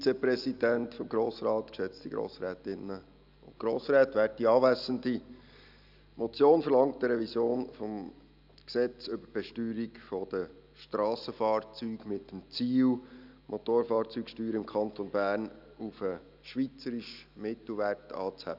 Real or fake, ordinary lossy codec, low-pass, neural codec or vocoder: real; none; 5.4 kHz; none